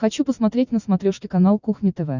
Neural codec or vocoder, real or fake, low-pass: none; real; 7.2 kHz